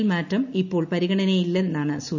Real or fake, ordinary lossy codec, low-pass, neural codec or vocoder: real; none; 7.2 kHz; none